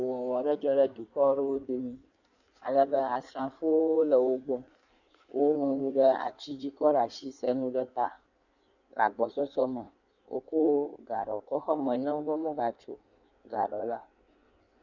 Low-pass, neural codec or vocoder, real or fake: 7.2 kHz; codec, 16 kHz in and 24 kHz out, 1.1 kbps, FireRedTTS-2 codec; fake